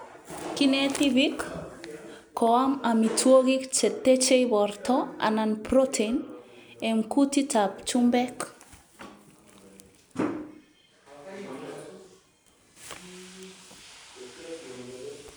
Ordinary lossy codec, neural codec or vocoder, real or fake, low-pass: none; none; real; none